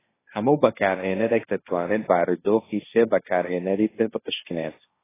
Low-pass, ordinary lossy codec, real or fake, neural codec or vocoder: 3.6 kHz; AAC, 16 kbps; fake; codec, 16 kHz, 1.1 kbps, Voila-Tokenizer